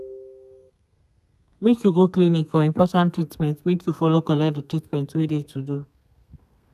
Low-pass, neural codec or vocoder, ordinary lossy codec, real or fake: 14.4 kHz; codec, 32 kHz, 1.9 kbps, SNAC; none; fake